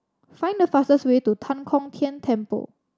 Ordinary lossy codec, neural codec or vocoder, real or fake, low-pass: none; none; real; none